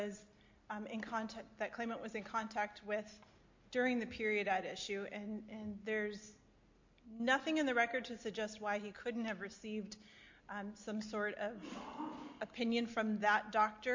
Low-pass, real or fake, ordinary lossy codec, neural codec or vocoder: 7.2 kHz; real; MP3, 48 kbps; none